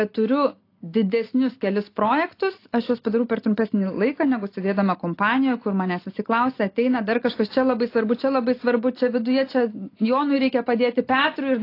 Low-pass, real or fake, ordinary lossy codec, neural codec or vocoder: 5.4 kHz; real; AAC, 32 kbps; none